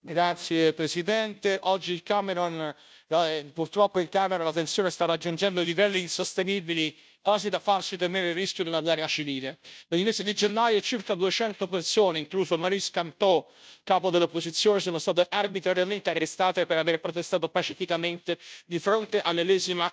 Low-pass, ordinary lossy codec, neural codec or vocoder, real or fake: none; none; codec, 16 kHz, 0.5 kbps, FunCodec, trained on Chinese and English, 25 frames a second; fake